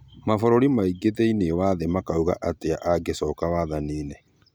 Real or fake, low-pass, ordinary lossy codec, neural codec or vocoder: real; none; none; none